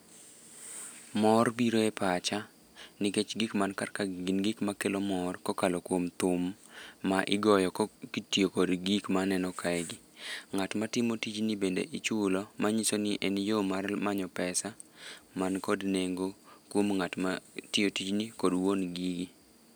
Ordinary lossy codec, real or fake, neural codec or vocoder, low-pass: none; real; none; none